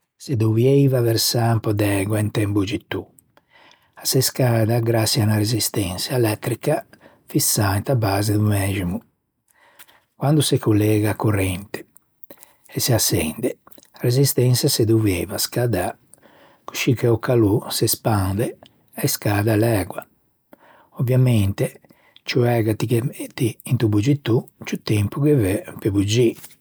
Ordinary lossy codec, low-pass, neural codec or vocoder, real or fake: none; none; none; real